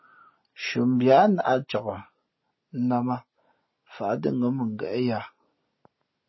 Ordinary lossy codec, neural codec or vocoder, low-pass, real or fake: MP3, 24 kbps; none; 7.2 kHz; real